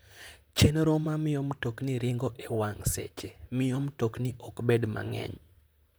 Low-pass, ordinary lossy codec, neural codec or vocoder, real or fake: none; none; vocoder, 44.1 kHz, 128 mel bands, Pupu-Vocoder; fake